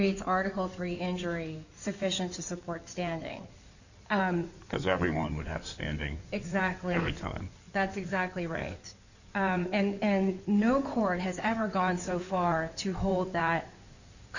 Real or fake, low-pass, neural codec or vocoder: fake; 7.2 kHz; codec, 16 kHz in and 24 kHz out, 2.2 kbps, FireRedTTS-2 codec